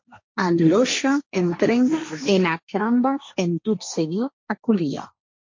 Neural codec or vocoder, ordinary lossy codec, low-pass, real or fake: codec, 16 kHz, 1.1 kbps, Voila-Tokenizer; MP3, 48 kbps; 7.2 kHz; fake